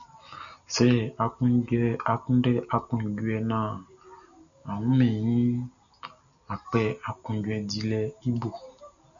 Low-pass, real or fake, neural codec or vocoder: 7.2 kHz; real; none